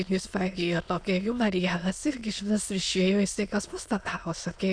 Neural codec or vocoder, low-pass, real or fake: autoencoder, 22.05 kHz, a latent of 192 numbers a frame, VITS, trained on many speakers; 9.9 kHz; fake